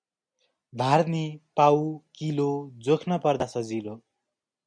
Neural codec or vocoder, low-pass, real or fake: none; 9.9 kHz; real